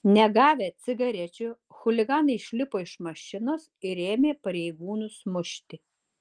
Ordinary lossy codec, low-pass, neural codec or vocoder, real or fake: Opus, 24 kbps; 9.9 kHz; none; real